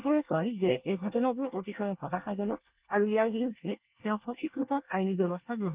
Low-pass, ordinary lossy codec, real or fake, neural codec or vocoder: 3.6 kHz; Opus, 24 kbps; fake; codec, 24 kHz, 1 kbps, SNAC